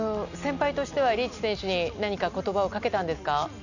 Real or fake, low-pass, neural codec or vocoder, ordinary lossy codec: real; 7.2 kHz; none; none